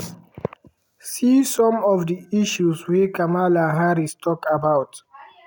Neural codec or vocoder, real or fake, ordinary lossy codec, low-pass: none; real; none; none